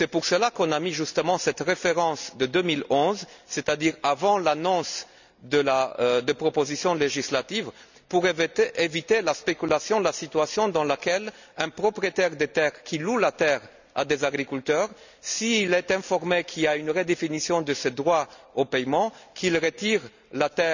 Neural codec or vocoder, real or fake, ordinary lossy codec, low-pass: none; real; none; 7.2 kHz